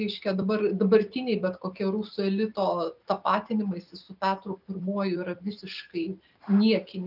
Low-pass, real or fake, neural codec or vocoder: 5.4 kHz; real; none